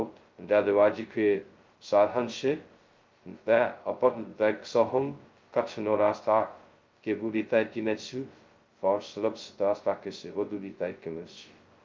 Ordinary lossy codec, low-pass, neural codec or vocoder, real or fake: Opus, 32 kbps; 7.2 kHz; codec, 16 kHz, 0.2 kbps, FocalCodec; fake